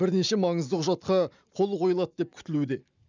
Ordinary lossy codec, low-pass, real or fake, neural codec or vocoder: none; 7.2 kHz; real; none